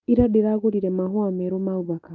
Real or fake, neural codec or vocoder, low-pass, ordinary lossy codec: real; none; 7.2 kHz; Opus, 32 kbps